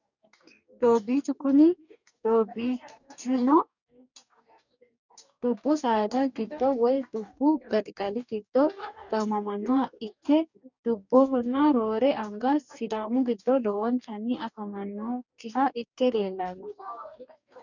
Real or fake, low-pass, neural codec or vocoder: fake; 7.2 kHz; codec, 44.1 kHz, 2.6 kbps, DAC